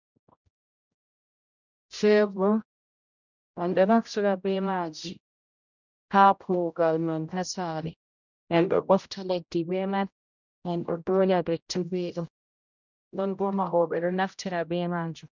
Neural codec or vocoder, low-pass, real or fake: codec, 16 kHz, 0.5 kbps, X-Codec, HuBERT features, trained on general audio; 7.2 kHz; fake